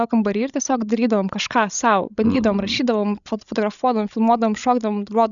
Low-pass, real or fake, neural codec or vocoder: 7.2 kHz; fake; codec, 16 kHz, 16 kbps, FreqCodec, larger model